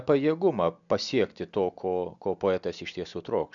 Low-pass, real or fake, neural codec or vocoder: 7.2 kHz; real; none